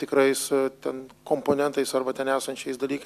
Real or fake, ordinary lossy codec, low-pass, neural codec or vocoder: real; AAC, 96 kbps; 14.4 kHz; none